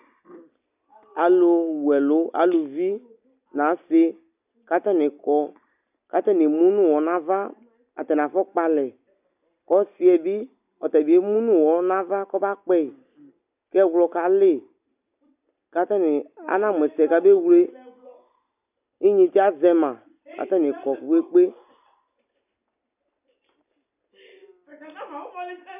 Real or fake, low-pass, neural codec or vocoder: real; 3.6 kHz; none